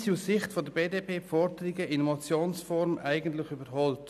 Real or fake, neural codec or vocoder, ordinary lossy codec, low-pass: real; none; none; 14.4 kHz